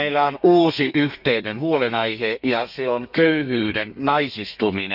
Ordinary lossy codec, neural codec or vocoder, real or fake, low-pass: none; codec, 32 kHz, 1.9 kbps, SNAC; fake; 5.4 kHz